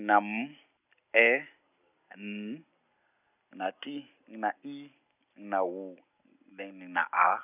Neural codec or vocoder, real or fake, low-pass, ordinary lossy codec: none; real; 3.6 kHz; none